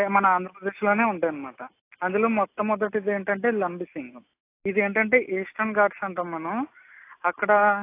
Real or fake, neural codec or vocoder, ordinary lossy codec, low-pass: real; none; AAC, 24 kbps; 3.6 kHz